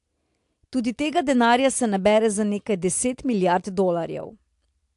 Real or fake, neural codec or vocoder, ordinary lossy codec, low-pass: real; none; AAC, 64 kbps; 10.8 kHz